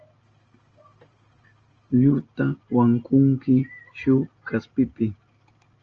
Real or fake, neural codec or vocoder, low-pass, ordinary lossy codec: real; none; 7.2 kHz; Opus, 24 kbps